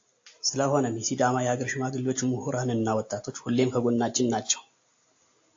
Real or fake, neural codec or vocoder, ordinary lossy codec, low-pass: real; none; AAC, 48 kbps; 7.2 kHz